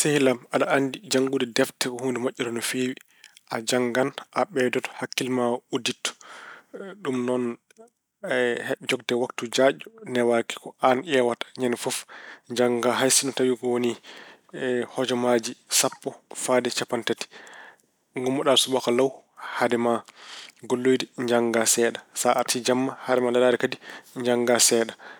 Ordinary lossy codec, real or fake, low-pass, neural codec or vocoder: none; real; none; none